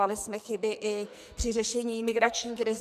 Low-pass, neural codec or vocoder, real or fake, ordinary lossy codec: 14.4 kHz; codec, 44.1 kHz, 2.6 kbps, SNAC; fake; AAC, 96 kbps